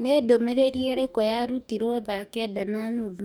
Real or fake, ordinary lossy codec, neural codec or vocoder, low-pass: fake; none; codec, 44.1 kHz, 2.6 kbps, DAC; none